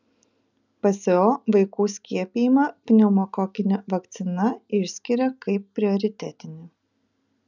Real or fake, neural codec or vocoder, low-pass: real; none; 7.2 kHz